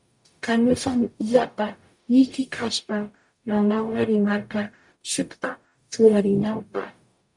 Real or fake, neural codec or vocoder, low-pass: fake; codec, 44.1 kHz, 0.9 kbps, DAC; 10.8 kHz